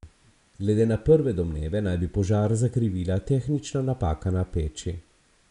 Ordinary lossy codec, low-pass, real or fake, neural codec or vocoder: none; 10.8 kHz; real; none